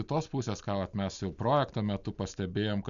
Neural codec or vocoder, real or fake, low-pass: none; real; 7.2 kHz